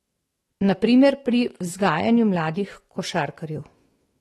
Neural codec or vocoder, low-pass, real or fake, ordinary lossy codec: autoencoder, 48 kHz, 128 numbers a frame, DAC-VAE, trained on Japanese speech; 19.8 kHz; fake; AAC, 32 kbps